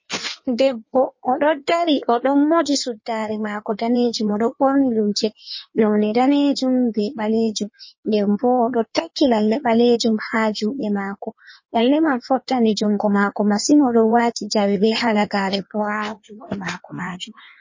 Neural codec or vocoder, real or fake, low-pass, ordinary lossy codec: codec, 16 kHz in and 24 kHz out, 1.1 kbps, FireRedTTS-2 codec; fake; 7.2 kHz; MP3, 32 kbps